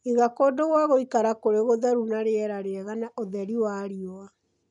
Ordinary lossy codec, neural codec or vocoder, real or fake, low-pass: none; none; real; 10.8 kHz